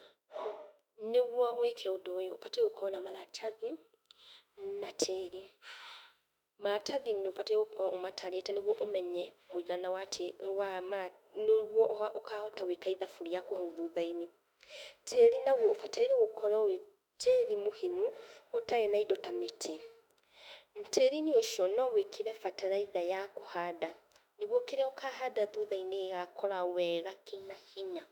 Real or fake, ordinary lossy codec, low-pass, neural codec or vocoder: fake; none; 19.8 kHz; autoencoder, 48 kHz, 32 numbers a frame, DAC-VAE, trained on Japanese speech